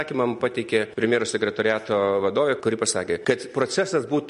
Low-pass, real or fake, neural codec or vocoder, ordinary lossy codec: 10.8 kHz; real; none; MP3, 48 kbps